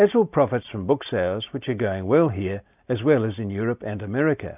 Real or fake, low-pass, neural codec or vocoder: real; 3.6 kHz; none